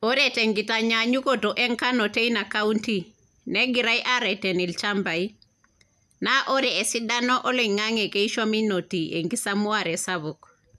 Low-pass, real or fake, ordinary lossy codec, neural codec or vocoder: 14.4 kHz; real; none; none